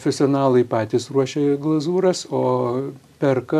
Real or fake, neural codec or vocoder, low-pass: real; none; 14.4 kHz